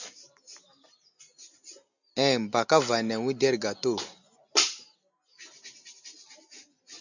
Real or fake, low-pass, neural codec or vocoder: real; 7.2 kHz; none